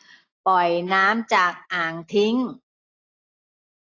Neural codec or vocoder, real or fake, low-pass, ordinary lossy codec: none; real; 7.2 kHz; AAC, 32 kbps